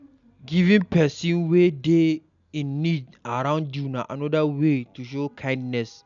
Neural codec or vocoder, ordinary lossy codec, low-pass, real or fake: none; none; 7.2 kHz; real